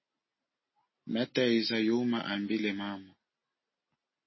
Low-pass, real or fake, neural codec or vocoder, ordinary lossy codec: 7.2 kHz; real; none; MP3, 24 kbps